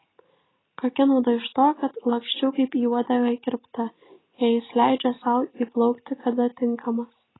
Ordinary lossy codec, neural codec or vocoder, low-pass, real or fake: AAC, 16 kbps; none; 7.2 kHz; real